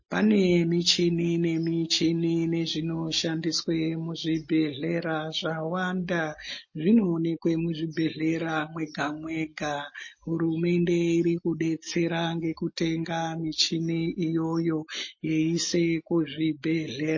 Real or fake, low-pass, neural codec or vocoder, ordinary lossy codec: real; 7.2 kHz; none; MP3, 32 kbps